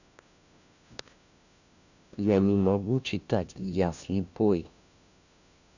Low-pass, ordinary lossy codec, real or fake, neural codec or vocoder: 7.2 kHz; none; fake; codec, 16 kHz, 1 kbps, FunCodec, trained on LibriTTS, 50 frames a second